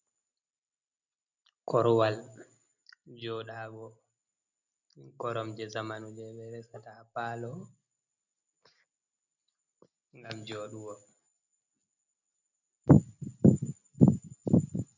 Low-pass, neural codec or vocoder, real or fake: 7.2 kHz; none; real